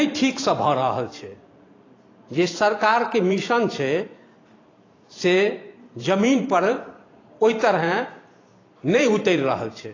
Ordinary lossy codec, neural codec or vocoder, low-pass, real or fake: AAC, 32 kbps; vocoder, 44.1 kHz, 128 mel bands every 512 samples, BigVGAN v2; 7.2 kHz; fake